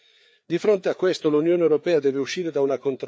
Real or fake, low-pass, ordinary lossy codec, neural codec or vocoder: fake; none; none; codec, 16 kHz, 4 kbps, FreqCodec, larger model